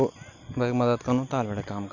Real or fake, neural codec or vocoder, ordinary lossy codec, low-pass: real; none; none; 7.2 kHz